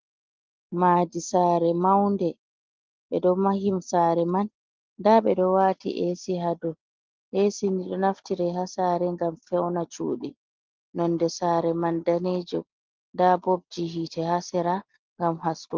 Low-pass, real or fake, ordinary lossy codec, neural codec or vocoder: 7.2 kHz; real; Opus, 24 kbps; none